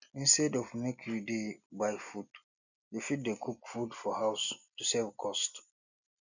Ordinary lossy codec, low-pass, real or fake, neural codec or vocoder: none; 7.2 kHz; real; none